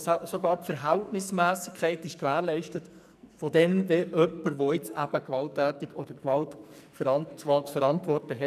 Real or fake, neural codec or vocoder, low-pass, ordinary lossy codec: fake; codec, 44.1 kHz, 2.6 kbps, SNAC; 14.4 kHz; none